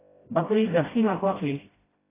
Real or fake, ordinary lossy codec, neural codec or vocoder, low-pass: fake; AAC, 24 kbps; codec, 16 kHz, 0.5 kbps, FreqCodec, smaller model; 3.6 kHz